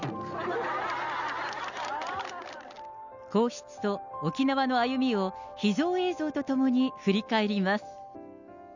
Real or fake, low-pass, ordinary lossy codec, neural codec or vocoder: real; 7.2 kHz; none; none